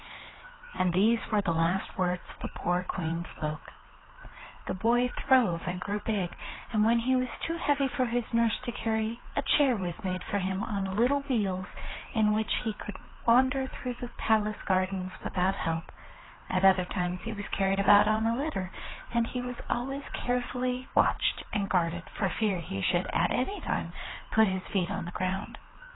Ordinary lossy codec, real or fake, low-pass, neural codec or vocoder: AAC, 16 kbps; fake; 7.2 kHz; codec, 16 kHz, 4 kbps, FreqCodec, larger model